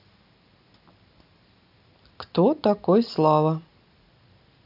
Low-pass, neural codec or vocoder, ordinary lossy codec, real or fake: 5.4 kHz; none; none; real